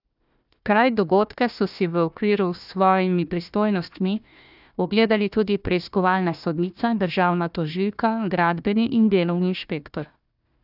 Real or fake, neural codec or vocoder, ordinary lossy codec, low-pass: fake; codec, 16 kHz, 1 kbps, FunCodec, trained on Chinese and English, 50 frames a second; none; 5.4 kHz